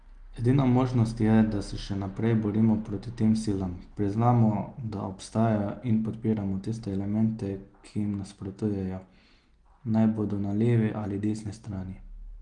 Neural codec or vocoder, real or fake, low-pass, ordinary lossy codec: none; real; 9.9 kHz; Opus, 24 kbps